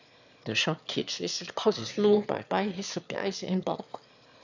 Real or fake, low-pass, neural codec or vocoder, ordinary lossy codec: fake; 7.2 kHz; autoencoder, 22.05 kHz, a latent of 192 numbers a frame, VITS, trained on one speaker; none